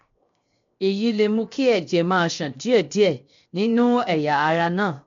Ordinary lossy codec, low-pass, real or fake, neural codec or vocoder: MP3, 48 kbps; 7.2 kHz; fake; codec, 16 kHz, 0.7 kbps, FocalCodec